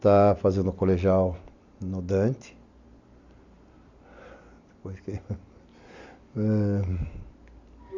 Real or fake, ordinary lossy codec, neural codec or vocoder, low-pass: real; AAC, 48 kbps; none; 7.2 kHz